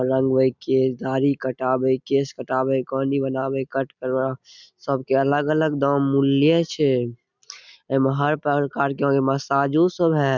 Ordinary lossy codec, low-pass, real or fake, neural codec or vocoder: none; 7.2 kHz; real; none